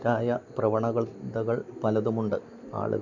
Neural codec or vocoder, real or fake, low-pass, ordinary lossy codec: none; real; 7.2 kHz; none